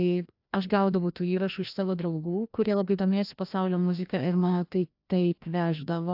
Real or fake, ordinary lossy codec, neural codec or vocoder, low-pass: fake; AAC, 48 kbps; codec, 16 kHz, 1 kbps, FreqCodec, larger model; 5.4 kHz